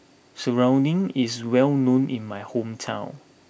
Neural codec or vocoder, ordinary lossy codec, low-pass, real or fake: none; none; none; real